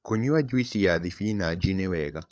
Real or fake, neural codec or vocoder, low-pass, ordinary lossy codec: fake; codec, 16 kHz, 8 kbps, FreqCodec, larger model; none; none